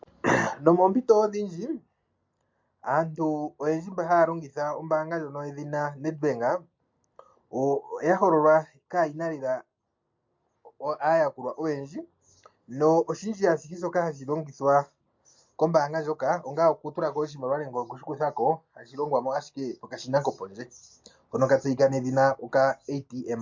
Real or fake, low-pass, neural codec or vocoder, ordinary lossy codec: real; 7.2 kHz; none; MP3, 48 kbps